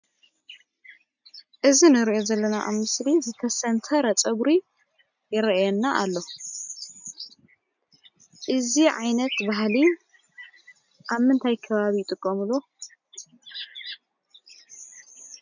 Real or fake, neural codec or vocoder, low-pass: real; none; 7.2 kHz